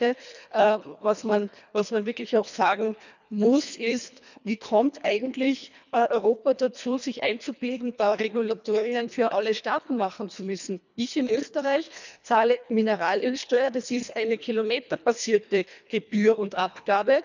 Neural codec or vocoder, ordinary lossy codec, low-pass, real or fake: codec, 24 kHz, 1.5 kbps, HILCodec; none; 7.2 kHz; fake